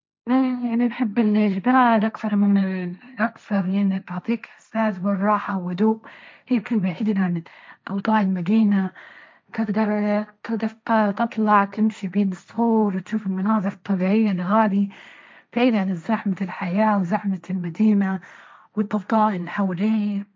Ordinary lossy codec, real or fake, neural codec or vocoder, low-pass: none; fake; codec, 16 kHz, 1.1 kbps, Voila-Tokenizer; none